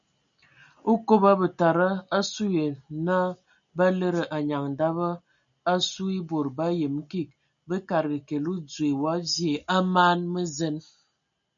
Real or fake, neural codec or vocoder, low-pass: real; none; 7.2 kHz